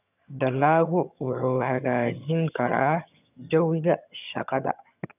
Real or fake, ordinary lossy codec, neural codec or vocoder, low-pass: fake; none; vocoder, 22.05 kHz, 80 mel bands, HiFi-GAN; 3.6 kHz